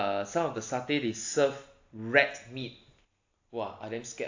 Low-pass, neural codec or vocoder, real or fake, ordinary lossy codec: 7.2 kHz; none; real; AAC, 48 kbps